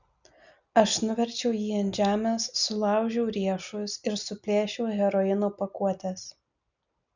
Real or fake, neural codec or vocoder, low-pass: real; none; 7.2 kHz